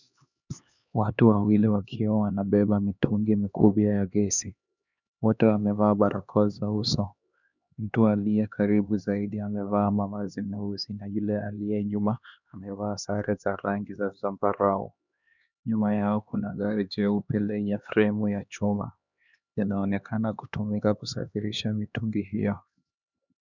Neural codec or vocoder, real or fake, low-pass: codec, 16 kHz, 2 kbps, X-Codec, HuBERT features, trained on LibriSpeech; fake; 7.2 kHz